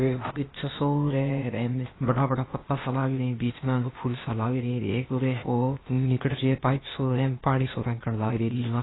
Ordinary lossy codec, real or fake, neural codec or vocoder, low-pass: AAC, 16 kbps; fake; codec, 16 kHz, 0.8 kbps, ZipCodec; 7.2 kHz